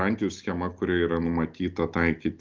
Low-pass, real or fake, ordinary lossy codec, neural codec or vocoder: 7.2 kHz; real; Opus, 32 kbps; none